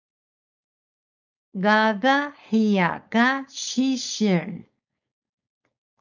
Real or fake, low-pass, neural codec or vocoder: fake; 7.2 kHz; codec, 16 kHz, 4.8 kbps, FACodec